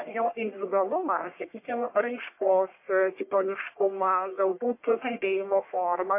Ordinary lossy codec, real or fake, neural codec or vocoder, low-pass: MP3, 24 kbps; fake; codec, 44.1 kHz, 1.7 kbps, Pupu-Codec; 3.6 kHz